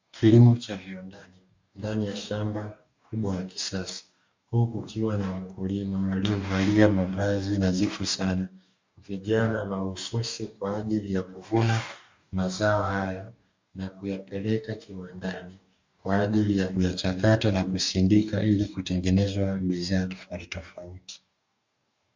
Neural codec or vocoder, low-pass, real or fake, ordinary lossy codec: codec, 44.1 kHz, 2.6 kbps, DAC; 7.2 kHz; fake; MP3, 64 kbps